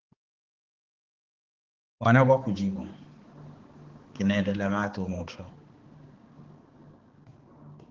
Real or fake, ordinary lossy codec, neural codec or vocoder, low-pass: fake; Opus, 16 kbps; codec, 16 kHz, 4 kbps, X-Codec, HuBERT features, trained on balanced general audio; 7.2 kHz